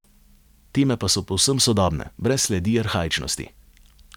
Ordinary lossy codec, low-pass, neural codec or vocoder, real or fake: none; 19.8 kHz; none; real